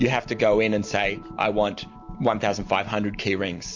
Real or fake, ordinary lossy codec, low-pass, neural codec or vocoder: real; MP3, 48 kbps; 7.2 kHz; none